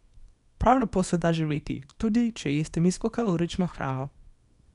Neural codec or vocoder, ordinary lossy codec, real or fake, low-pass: codec, 24 kHz, 0.9 kbps, WavTokenizer, small release; none; fake; 10.8 kHz